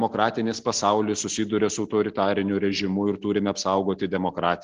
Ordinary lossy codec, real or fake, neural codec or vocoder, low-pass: Opus, 16 kbps; real; none; 7.2 kHz